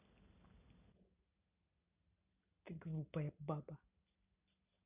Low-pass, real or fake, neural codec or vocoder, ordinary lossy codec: 3.6 kHz; real; none; none